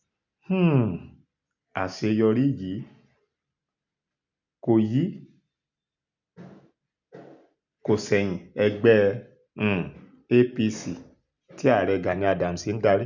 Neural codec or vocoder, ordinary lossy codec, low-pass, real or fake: none; none; 7.2 kHz; real